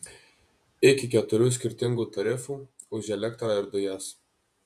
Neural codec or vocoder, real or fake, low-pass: vocoder, 48 kHz, 128 mel bands, Vocos; fake; 14.4 kHz